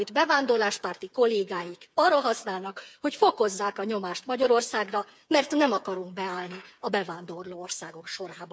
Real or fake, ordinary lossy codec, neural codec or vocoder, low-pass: fake; none; codec, 16 kHz, 8 kbps, FreqCodec, smaller model; none